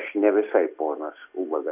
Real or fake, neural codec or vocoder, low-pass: real; none; 3.6 kHz